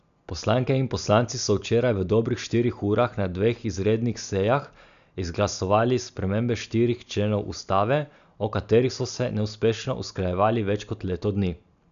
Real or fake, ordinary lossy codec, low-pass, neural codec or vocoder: real; none; 7.2 kHz; none